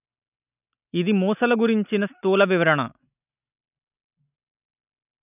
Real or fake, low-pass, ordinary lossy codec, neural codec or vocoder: real; 3.6 kHz; none; none